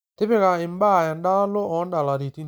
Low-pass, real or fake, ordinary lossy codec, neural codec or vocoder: none; real; none; none